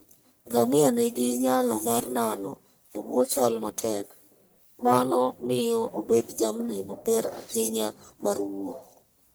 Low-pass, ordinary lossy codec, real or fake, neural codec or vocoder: none; none; fake; codec, 44.1 kHz, 1.7 kbps, Pupu-Codec